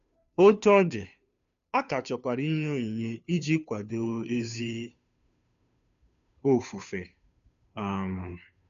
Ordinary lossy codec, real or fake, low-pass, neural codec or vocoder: none; fake; 7.2 kHz; codec, 16 kHz, 2 kbps, FunCodec, trained on Chinese and English, 25 frames a second